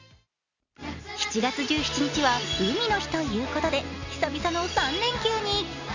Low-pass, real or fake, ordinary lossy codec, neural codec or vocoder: 7.2 kHz; real; none; none